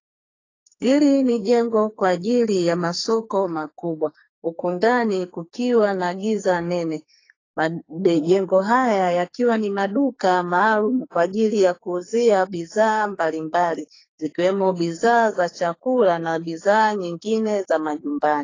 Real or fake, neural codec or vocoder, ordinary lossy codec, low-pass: fake; codec, 44.1 kHz, 2.6 kbps, SNAC; AAC, 32 kbps; 7.2 kHz